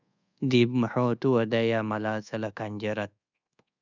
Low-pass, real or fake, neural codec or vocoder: 7.2 kHz; fake; codec, 24 kHz, 1.2 kbps, DualCodec